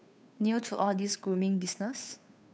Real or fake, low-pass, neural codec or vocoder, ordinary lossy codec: fake; none; codec, 16 kHz, 2 kbps, FunCodec, trained on Chinese and English, 25 frames a second; none